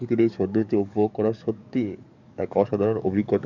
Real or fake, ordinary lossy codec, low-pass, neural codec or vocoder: fake; none; 7.2 kHz; codec, 44.1 kHz, 7.8 kbps, DAC